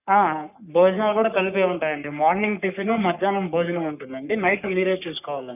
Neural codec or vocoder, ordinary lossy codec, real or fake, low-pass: codec, 44.1 kHz, 3.4 kbps, Pupu-Codec; none; fake; 3.6 kHz